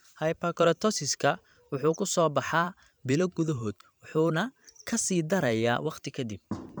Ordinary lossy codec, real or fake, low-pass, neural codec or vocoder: none; fake; none; vocoder, 44.1 kHz, 128 mel bands every 512 samples, BigVGAN v2